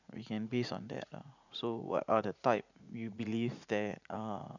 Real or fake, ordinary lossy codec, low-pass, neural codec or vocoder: fake; none; 7.2 kHz; vocoder, 44.1 kHz, 80 mel bands, Vocos